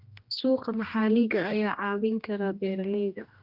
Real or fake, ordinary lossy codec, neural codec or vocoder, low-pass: fake; Opus, 32 kbps; codec, 16 kHz, 1 kbps, X-Codec, HuBERT features, trained on general audio; 5.4 kHz